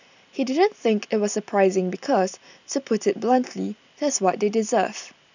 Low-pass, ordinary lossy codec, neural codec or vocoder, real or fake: 7.2 kHz; none; none; real